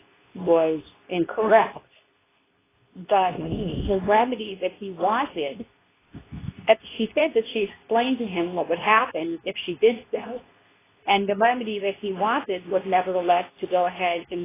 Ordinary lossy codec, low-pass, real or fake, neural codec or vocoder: AAC, 16 kbps; 3.6 kHz; fake; codec, 24 kHz, 0.9 kbps, WavTokenizer, medium speech release version 2